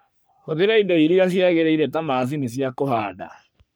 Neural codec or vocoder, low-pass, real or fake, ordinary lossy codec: codec, 44.1 kHz, 3.4 kbps, Pupu-Codec; none; fake; none